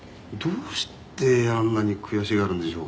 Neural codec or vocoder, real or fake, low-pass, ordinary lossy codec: none; real; none; none